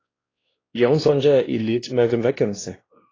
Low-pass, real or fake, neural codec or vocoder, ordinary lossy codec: 7.2 kHz; fake; codec, 16 kHz, 1 kbps, X-Codec, WavLM features, trained on Multilingual LibriSpeech; AAC, 32 kbps